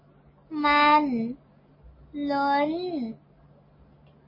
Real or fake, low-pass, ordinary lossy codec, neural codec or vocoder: real; 5.4 kHz; MP3, 24 kbps; none